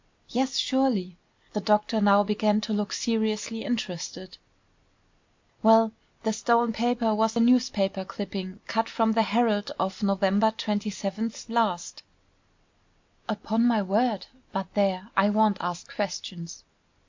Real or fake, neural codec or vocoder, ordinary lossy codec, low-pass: real; none; MP3, 48 kbps; 7.2 kHz